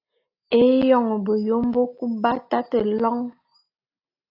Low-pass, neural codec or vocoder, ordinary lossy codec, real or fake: 5.4 kHz; none; AAC, 32 kbps; real